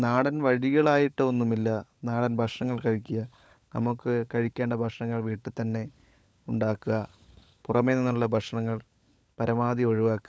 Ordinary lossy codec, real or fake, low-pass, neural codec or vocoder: none; fake; none; codec, 16 kHz, 16 kbps, FunCodec, trained on LibriTTS, 50 frames a second